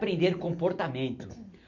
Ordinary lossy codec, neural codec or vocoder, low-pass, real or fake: none; none; 7.2 kHz; real